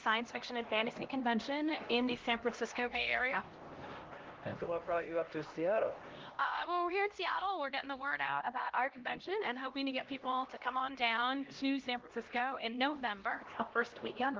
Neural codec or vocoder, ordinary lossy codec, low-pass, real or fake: codec, 16 kHz, 1 kbps, X-Codec, HuBERT features, trained on LibriSpeech; Opus, 16 kbps; 7.2 kHz; fake